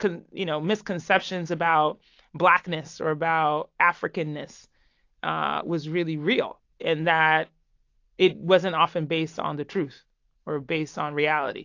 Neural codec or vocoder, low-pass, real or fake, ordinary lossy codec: none; 7.2 kHz; real; AAC, 48 kbps